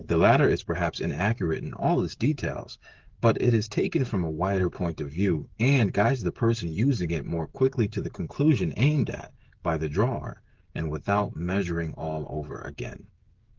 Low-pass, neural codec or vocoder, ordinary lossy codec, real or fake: 7.2 kHz; codec, 16 kHz, 16 kbps, FreqCodec, smaller model; Opus, 16 kbps; fake